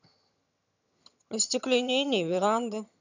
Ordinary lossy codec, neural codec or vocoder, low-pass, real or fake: none; vocoder, 22.05 kHz, 80 mel bands, HiFi-GAN; 7.2 kHz; fake